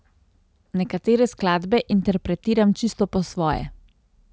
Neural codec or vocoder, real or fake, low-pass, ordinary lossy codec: none; real; none; none